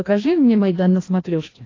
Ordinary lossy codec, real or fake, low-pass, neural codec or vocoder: AAC, 32 kbps; fake; 7.2 kHz; codec, 16 kHz, 1 kbps, FreqCodec, larger model